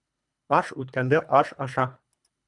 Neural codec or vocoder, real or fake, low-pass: codec, 24 kHz, 3 kbps, HILCodec; fake; 10.8 kHz